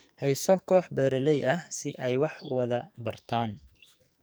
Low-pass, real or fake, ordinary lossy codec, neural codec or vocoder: none; fake; none; codec, 44.1 kHz, 2.6 kbps, SNAC